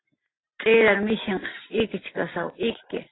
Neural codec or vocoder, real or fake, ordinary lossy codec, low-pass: none; real; AAC, 16 kbps; 7.2 kHz